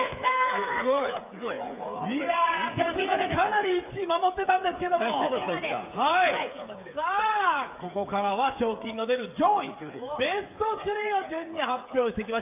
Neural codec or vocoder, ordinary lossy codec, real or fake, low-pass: codec, 16 kHz, 8 kbps, FreqCodec, smaller model; AAC, 32 kbps; fake; 3.6 kHz